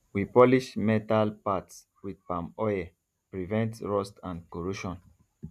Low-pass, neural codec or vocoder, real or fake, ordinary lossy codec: 14.4 kHz; none; real; none